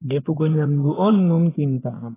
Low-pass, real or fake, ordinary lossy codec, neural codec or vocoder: 3.6 kHz; fake; AAC, 16 kbps; vocoder, 24 kHz, 100 mel bands, Vocos